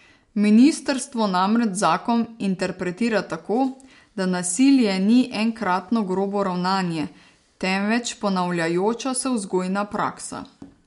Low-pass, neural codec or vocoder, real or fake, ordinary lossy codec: 10.8 kHz; none; real; MP3, 64 kbps